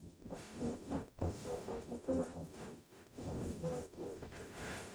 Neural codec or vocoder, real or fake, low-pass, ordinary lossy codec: codec, 44.1 kHz, 0.9 kbps, DAC; fake; none; none